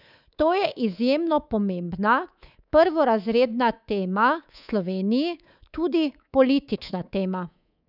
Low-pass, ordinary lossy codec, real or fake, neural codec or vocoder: 5.4 kHz; none; fake; codec, 24 kHz, 3.1 kbps, DualCodec